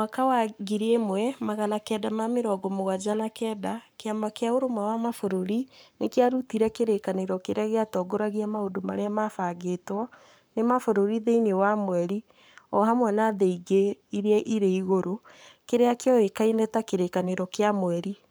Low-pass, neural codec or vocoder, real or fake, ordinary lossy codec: none; codec, 44.1 kHz, 7.8 kbps, Pupu-Codec; fake; none